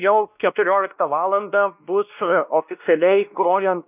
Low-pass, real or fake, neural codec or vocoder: 3.6 kHz; fake; codec, 16 kHz, 1 kbps, X-Codec, WavLM features, trained on Multilingual LibriSpeech